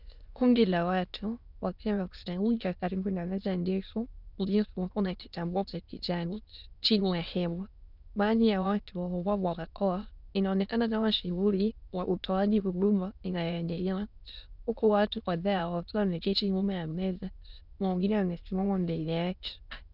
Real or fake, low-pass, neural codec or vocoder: fake; 5.4 kHz; autoencoder, 22.05 kHz, a latent of 192 numbers a frame, VITS, trained on many speakers